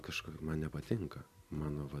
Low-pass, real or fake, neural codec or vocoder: 14.4 kHz; real; none